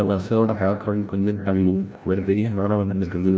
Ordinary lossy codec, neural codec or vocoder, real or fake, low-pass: none; codec, 16 kHz, 0.5 kbps, FreqCodec, larger model; fake; none